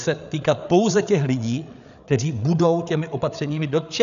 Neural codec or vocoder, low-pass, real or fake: codec, 16 kHz, 8 kbps, FreqCodec, larger model; 7.2 kHz; fake